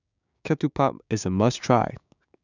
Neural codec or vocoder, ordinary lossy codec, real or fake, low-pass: codec, 16 kHz, 6 kbps, DAC; none; fake; 7.2 kHz